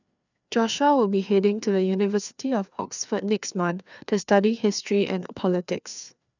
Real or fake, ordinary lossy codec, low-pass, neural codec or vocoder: fake; none; 7.2 kHz; codec, 16 kHz, 2 kbps, FreqCodec, larger model